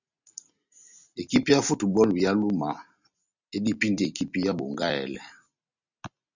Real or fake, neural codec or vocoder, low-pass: real; none; 7.2 kHz